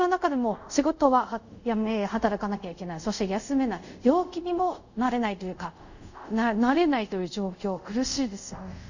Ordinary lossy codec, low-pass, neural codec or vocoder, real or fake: none; 7.2 kHz; codec, 24 kHz, 0.5 kbps, DualCodec; fake